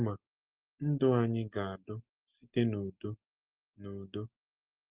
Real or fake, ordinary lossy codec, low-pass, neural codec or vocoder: real; Opus, 16 kbps; 3.6 kHz; none